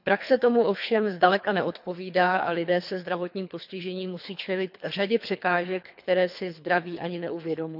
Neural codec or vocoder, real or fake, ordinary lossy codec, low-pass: codec, 24 kHz, 3 kbps, HILCodec; fake; none; 5.4 kHz